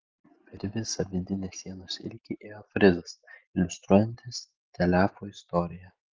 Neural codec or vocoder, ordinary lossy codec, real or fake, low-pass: none; Opus, 24 kbps; real; 7.2 kHz